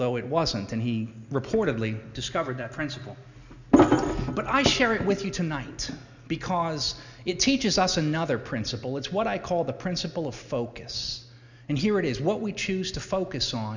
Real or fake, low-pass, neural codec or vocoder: real; 7.2 kHz; none